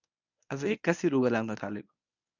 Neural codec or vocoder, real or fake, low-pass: codec, 24 kHz, 0.9 kbps, WavTokenizer, medium speech release version 1; fake; 7.2 kHz